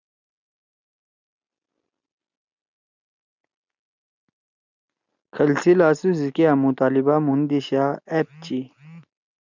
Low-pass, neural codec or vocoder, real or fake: 7.2 kHz; none; real